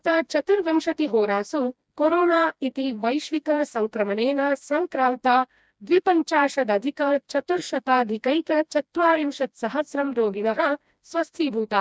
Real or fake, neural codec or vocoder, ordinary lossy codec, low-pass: fake; codec, 16 kHz, 1 kbps, FreqCodec, smaller model; none; none